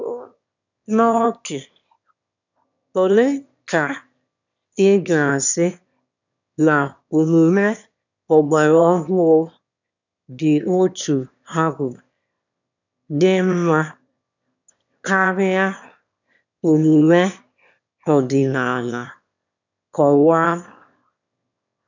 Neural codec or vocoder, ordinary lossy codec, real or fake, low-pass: autoencoder, 22.05 kHz, a latent of 192 numbers a frame, VITS, trained on one speaker; none; fake; 7.2 kHz